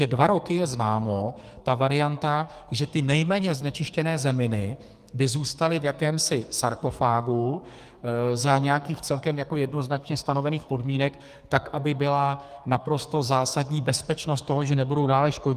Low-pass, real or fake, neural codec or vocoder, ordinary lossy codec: 14.4 kHz; fake; codec, 44.1 kHz, 2.6 kbps, SNAC; Opus, 32 kbps